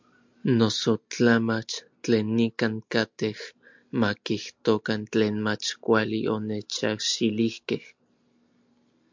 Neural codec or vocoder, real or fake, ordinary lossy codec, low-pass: none; real; MP3, 64 kbps; 7.2 kHz